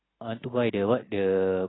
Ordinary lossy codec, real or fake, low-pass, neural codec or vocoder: AAC, 16 kbps; real; 7.2 kHz; none